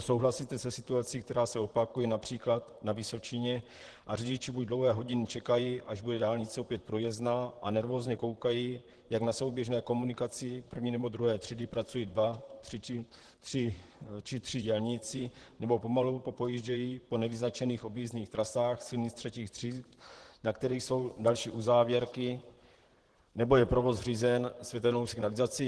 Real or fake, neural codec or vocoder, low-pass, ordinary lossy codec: fake; vocoder, 44.1 kHz, 128 mel bands, Pupu-Vocoder; 10.8 kHz; Opus, 16 kbps